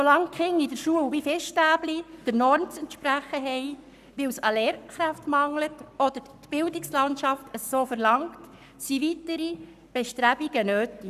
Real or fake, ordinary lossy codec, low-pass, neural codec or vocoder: fake; none; 14.4 kHz; codec, 44.1 kHz, 7.8 kbps, Pupu-Codec